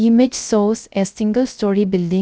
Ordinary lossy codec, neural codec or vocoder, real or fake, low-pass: none; codec, 16 kHz, 0.3 kbps, FocalCodec; fake; none